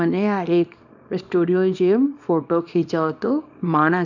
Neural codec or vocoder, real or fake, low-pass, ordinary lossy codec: codec, 24 kHz, 0.9 kbps, WavTokenizer, small release; fake; 7.2 kHz; none